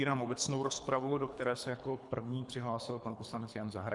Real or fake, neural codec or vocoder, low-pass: fake; codec, 24 kHz, 3 kbps, HILCodec; 10.8 kHz